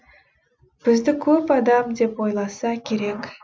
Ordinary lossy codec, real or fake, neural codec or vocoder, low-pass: none; real; none; none